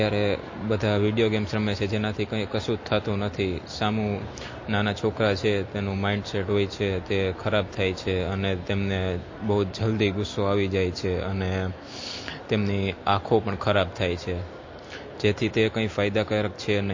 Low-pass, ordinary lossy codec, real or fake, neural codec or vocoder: 7.2 kHz; MP3, 32 kbps; real; none